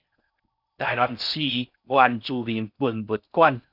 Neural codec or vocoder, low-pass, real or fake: codec, 16 kHz in and 24 kHz out, 0.6 kbps, FocalCodec, streaming, 4096 codes; 5.4 kHz; fake